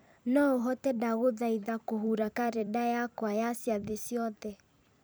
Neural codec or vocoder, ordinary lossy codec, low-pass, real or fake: vocoder, 44.1 kHz, 128 mel bands every 256 samples, BigVGAN v2; none; none; fake